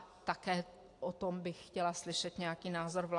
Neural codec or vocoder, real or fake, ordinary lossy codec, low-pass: none; real; AAC, 48 kbps; 10.8 kHz